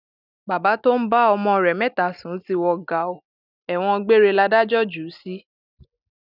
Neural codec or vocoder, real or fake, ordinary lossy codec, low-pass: none; real; none; 5.4 kHz